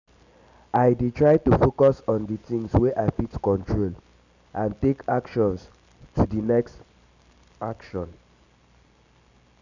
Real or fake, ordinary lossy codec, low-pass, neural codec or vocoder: real; none; 7.2 kHz; none